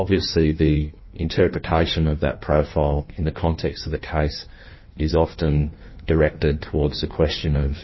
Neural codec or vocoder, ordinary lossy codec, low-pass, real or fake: codec, 16 kHz in and 24 kHz out, 1.1 kbps, FireRedTTS-2 codec; MP3, 24 kbps; 7.2 kHz; fake